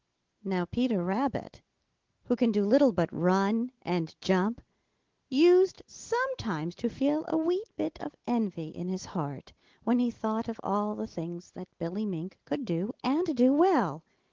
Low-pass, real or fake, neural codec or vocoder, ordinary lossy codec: 7.2 kHz; real; none; Opus, 16 kbps